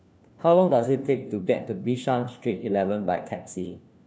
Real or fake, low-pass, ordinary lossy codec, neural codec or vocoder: fake; none; none; codec, 16 kHz, 1 kbps, FunCodec, trained on LibriTTS, 50 frames a second